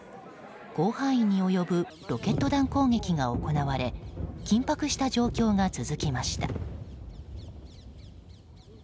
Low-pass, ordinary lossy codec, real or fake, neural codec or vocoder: none; none; real; none